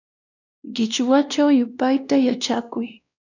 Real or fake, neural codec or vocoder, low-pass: fake; codec, 16 kHz, 1 kbps, X-Codec, WavLM features, trained on Multilingual LibriSpeech; 7.2 kHz